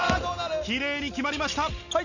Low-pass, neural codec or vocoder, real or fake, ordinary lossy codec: 7.2 kHz; none; real; none